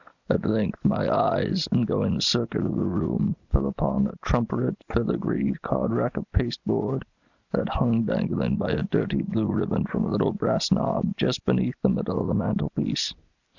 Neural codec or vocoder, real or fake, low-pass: none; real; 7.2 kHz